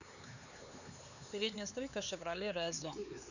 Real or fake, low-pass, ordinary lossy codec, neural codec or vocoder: fake; 7.2 kHz; none; codec, 16 kHz, 4 kbps, X-Codec, HuBERT features, trained on LibriSpeech